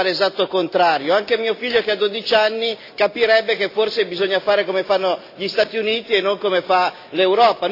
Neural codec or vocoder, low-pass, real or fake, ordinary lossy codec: none; 5.4 kHz; real; AAC, 32 kbps